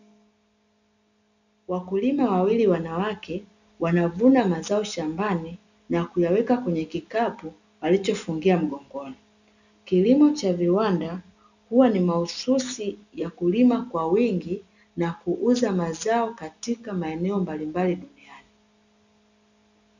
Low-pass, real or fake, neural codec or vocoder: 7.2 kHz; real; none